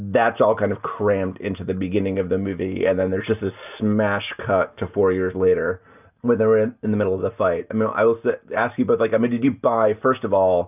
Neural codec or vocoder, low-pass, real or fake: none; 3.6 kHz; real